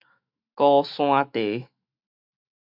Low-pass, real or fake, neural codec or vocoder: 5.4 kHz; fake; autoencoder, 48 kHz, 128 numbers a frame, DAC-VAE, trained on Japanese speech